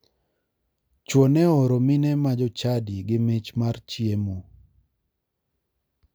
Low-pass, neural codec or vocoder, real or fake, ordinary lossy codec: none; none; real; none